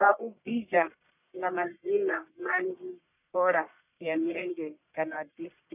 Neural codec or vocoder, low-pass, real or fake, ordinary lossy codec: codec, 44.1 kHz, 1.7 kbps, Pupu-Codec; 3.6 kHz; fake; none